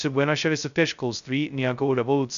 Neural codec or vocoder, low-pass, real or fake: codec, 16 kHz, 0.2 kbps, FocalCodec; 7.2 kHz; fake